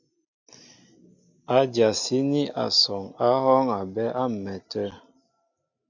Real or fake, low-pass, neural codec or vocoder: real; 7.2 kHz; none